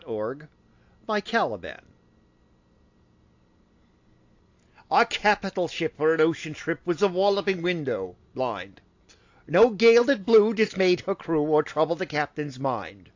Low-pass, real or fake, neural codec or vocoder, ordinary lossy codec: 7.2 kHz; real; none; AAC, 48 kbps